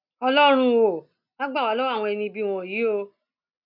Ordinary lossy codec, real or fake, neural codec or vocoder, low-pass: none; real; none; 5.4 kHz